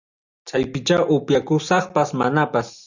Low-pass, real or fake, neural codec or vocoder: 7.2 kHz; real; none